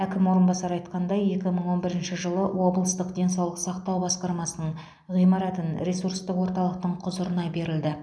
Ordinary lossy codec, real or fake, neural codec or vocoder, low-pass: none; real; none; none